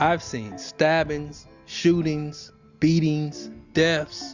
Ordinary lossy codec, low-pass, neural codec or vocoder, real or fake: Opus, 64 kbps; 7.2 kHz; none; real